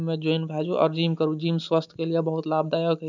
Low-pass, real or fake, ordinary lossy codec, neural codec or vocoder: 7.2 kHz; real; none; none